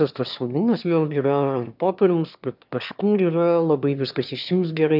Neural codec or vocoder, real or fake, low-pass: autoencoder, 22.05 kHz, a latent of 192 numbers a frame, VITS, trained on one speaker; fake; 5.4 kHz